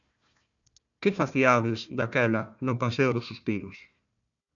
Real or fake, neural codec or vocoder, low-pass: fake; codec, 16 kHz, 1 kbps, FunCodec, trained on Chinese and English, 50 frames a second; 7.2 kHz